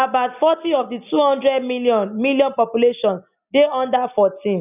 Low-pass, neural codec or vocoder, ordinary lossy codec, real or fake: 3.6 kHz; none; none; real